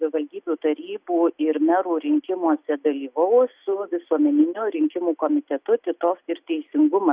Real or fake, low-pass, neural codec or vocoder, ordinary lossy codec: real; 3.6 kHz; none; Opus, 64 kbps